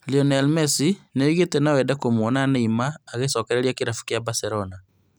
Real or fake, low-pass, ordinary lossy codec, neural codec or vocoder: real; none; none; none